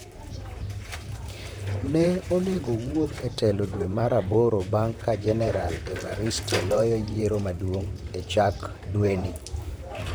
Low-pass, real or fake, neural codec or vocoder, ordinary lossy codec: none; fake; vocoder, 44.1 kHz, 128 mel bands, Pupu-Vocoder; none